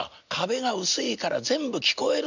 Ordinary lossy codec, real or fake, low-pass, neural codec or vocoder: none; real; 7.2 kHz; none